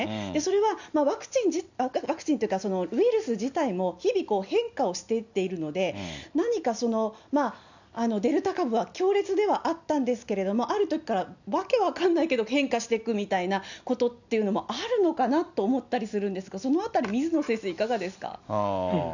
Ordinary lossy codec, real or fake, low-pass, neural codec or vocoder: none; real; 7.2 kHz; none